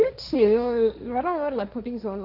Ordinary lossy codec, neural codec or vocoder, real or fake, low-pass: Opus, 64 kbps; codec, 16 kHz, 1.1 kbps, Voila-Tokenizer; fake; 5.4 kHz